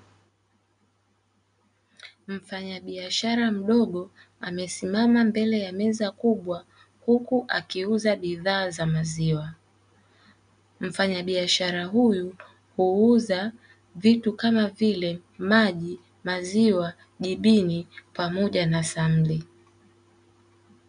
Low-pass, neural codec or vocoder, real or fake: 9.9 kHz; none; real